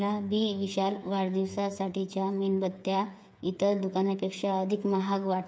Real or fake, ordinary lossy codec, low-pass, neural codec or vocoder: fake; none; none; codec, 16 kHz, 8 kbps, FreqCodec, smaller model